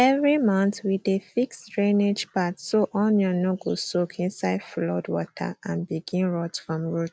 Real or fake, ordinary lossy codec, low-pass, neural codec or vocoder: real; none; none; none